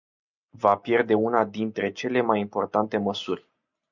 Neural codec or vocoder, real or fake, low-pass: none; real; 7.2 kHz